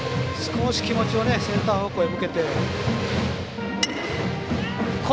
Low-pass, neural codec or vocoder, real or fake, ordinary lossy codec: none; none; real; none